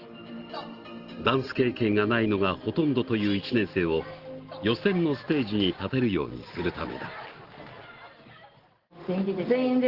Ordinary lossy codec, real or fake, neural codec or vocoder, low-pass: Opus, 16 kbps; real; none; 5.4 kHz